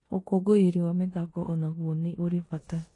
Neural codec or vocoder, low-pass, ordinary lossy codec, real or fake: codec, 16 kHz in and 24 kHz out, 0.9 kbps, LongCat-Audio-Codec, four codebook decoder; 10.8 kHz; AAC, 32 kbps; fake